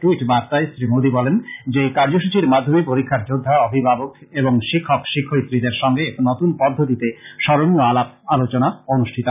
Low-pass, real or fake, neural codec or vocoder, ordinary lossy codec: 3.6 kHz; real; none; none